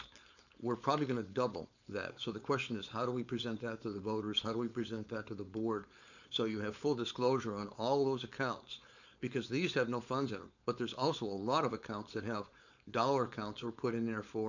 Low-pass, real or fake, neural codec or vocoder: 7.2 kHz; fake; codec, 16 kHz, 4.8 kbps, FACodec